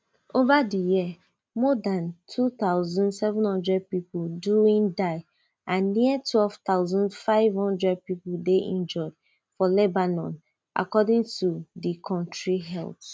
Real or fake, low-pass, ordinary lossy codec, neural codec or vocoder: real; none; none; none